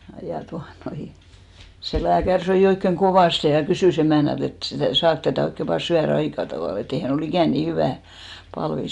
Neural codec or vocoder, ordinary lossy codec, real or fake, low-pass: none; none; real; 10.8 kHz